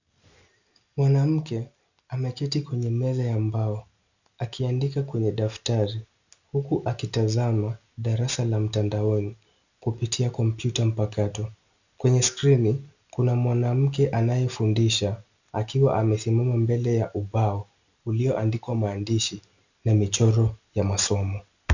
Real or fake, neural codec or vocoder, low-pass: real; none; 7.2 kHz